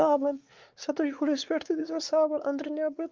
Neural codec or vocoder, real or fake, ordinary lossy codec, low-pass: codec, 16 kHz, 4 kbps, X-Codec, WavLM features, trained on Multilingual LibriSpeech; fake; Opus, 24 kbps; 7.2 kHz